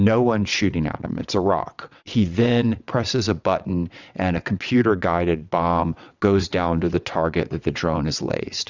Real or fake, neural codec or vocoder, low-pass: fake; vocoder, 22.05 kHz, 80 mel bands, WaveNeXt; 7.2 kHz